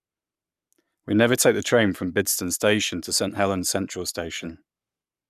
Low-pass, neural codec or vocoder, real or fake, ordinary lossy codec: 14.4 kHz; codec, 44.1 kHz, 7.8 kbps, Pupu-Codec; fake; none